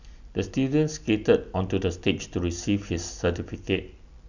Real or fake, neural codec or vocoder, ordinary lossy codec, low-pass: real; none; none; 7.2 kHz